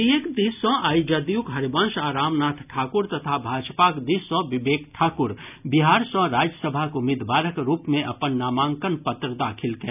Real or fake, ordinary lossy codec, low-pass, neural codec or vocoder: real; none; 3.6 kHz; none